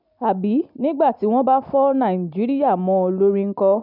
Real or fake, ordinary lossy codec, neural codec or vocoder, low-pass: real; none; none; 5.4 kHz